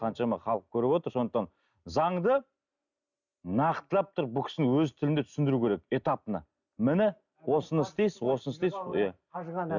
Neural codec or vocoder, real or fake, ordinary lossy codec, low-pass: none; real; none; 7.2 kHz